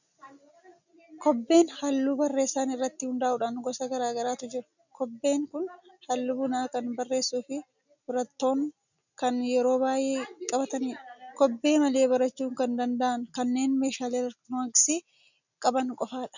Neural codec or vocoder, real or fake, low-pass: none; real; 7.2 kHz